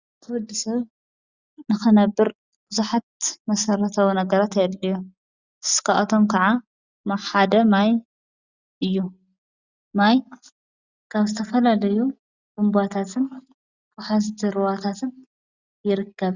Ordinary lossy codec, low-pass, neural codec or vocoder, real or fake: Opus, 64 kbps; 7.2 kHz; none; real